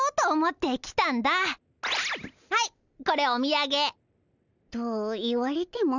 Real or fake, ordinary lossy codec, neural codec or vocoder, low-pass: real; none; none; 7.2 kHz